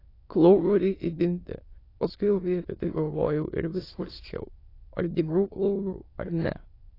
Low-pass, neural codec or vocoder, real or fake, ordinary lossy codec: 5.4 kHz; autoencoder, 22.05 kHz, a latent of 192 numbers a frame, VITS, trained on many speakers; fake; AAC, 24 kbps